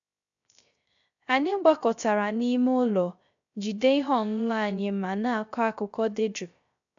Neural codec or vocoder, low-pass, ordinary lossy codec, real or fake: codec, 16 kHz, 0.3 kbps, FocalCodec; 7.2 kHz; none; fake